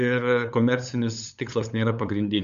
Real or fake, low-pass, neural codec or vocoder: fake; 7.2 kHz; codec, 16 kHz, 8 kbps, FunCodec, trained on LibriTTS, 25 frames a second